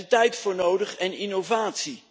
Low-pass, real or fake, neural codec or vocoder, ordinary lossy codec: none; real; none; none